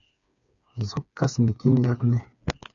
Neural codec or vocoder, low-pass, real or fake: codec, 16 kHz, 4 kbps, FreqCodec, smaller model; 7.2 kHz; fake